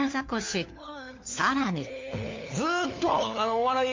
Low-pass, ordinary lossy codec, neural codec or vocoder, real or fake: 7.2 kHz; MP3, 48 kbps; codec, 16 kHz, 4 kbps, FunCodec, trained on LibriTTS, 50 frames a second; fake